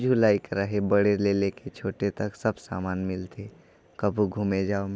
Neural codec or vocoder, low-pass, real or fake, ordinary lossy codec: none; none; real; none